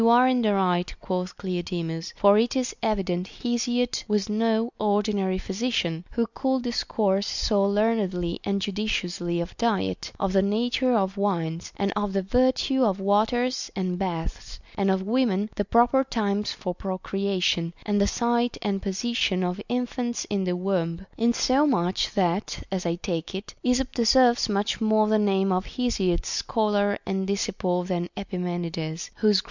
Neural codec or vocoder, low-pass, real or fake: none; 7.2 kHz; real